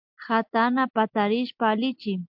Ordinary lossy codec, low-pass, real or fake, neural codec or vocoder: MP3, 48 kbps; 5.4 kHz; real; none